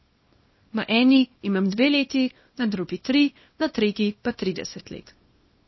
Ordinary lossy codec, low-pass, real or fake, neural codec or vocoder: MP3, 24 kbps; 7.2 kHz; fake; codec, 24 kHz, 0.9 kbps, WavTokenizer, small release